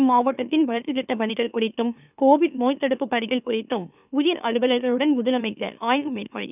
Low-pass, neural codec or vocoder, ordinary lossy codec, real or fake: 3.6 kHz; autoencoder, 44.1 kHz, a latent of 192 numbers a frame, MeloTTS; none; fake